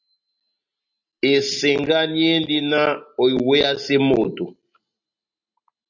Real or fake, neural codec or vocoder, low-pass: real; none; 7.2 kHz